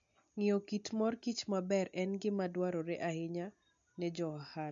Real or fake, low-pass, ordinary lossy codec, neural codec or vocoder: real; 7.2 kHz; MP3, 64 kbps; none